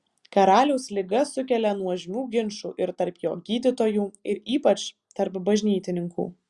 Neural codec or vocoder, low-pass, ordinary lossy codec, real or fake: none; 10.8 kHz; Opus, 64 kbps; real